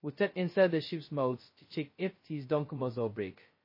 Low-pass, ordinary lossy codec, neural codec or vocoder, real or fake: 5.4 kHz; MP3, 24 kbps; codec, 16 kHz, 0.2 kbps, FocalCodec; fake